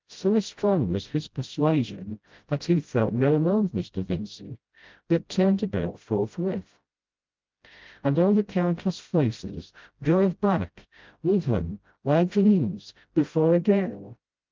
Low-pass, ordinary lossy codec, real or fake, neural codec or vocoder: 7.2 kHz; Opus, 24 kbps; fake; codec, 16 kHz, 0.5 kbps, FreqCodec, smaller model